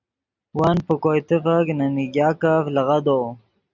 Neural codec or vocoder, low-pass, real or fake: none; 7.2 kHz; real